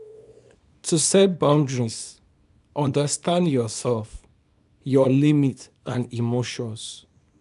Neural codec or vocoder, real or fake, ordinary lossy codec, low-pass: codec, 24 kHz, 0.9 kbps, WavTokenizer, small release; fake; none; 10.8 kHz